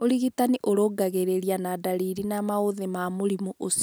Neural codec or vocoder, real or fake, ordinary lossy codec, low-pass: none; real; none; none